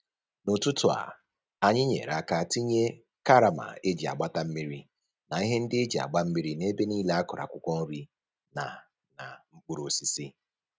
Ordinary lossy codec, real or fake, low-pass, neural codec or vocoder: none; real; none; none